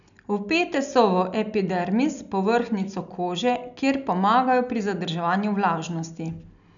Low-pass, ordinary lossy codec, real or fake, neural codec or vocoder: 7.2 kHz; none; real; none